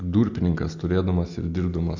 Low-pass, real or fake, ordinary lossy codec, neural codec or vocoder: 7.2 kHz; real; MP3, 64 kbps; none